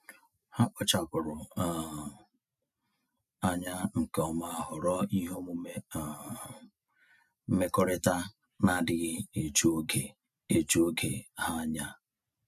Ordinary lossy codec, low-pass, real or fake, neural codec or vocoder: none; 14.4 kHz; real; none